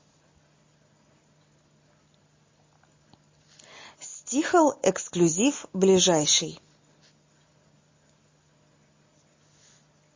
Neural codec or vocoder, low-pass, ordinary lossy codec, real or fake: none; 7.2 kHz; MP3, 32 kbps; real